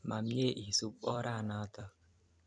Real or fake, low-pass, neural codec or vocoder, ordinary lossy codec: fake; 9.9 kHz; vocoder, 44.1 kHz, 128 mel bands every 256 samples, BigVGAN v2; none